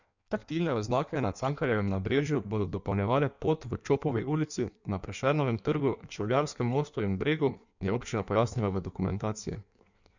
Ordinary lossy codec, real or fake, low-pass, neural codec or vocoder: none; fake; 7.2 kHz; codec, 16 kHz in and 24 kHz out, 1.1 kbps, FireRedTTS-2 codec